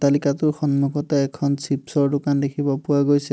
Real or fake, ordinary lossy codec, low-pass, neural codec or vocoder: real; none; none; none